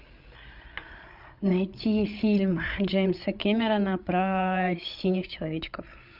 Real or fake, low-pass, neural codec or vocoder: fake; 5.4 kHz; codec, 16 kHz, 8 kbps, FreqCodec, larger model